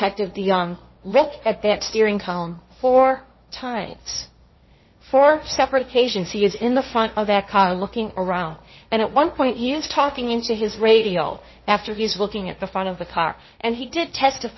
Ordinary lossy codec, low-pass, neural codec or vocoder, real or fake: MP3, 24 kbps; 7.2 kHz; codec, 16 kHz, 1.1 kbps, Voila-Tokenizer; fake